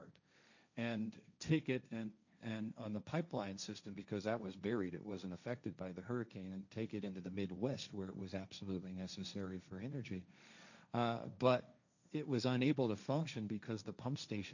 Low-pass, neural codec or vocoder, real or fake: 7.2 kHz; codec, 16 kHz, 1.1 kbps, Voila-Tokenizer; fake